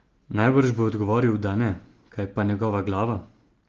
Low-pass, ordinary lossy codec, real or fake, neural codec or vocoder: 7.2 kHz; Opus, 16 kbps; real; none